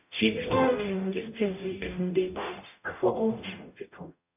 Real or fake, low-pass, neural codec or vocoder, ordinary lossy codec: fake; 3.6 kHz; codec, 44.1 kHz, 0.9 kbps, DAC; none